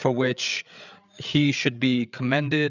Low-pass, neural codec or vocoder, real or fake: 7.2 kHz; codec, 16 kHz, 8 kbps, FreqCodec, larger model; fake